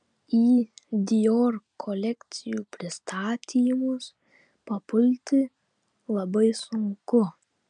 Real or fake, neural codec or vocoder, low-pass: real; none; 9.9 kHz